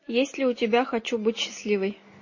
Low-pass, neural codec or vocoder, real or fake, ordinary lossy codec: 7.2 kHz; none; real; MP3, 32 kbps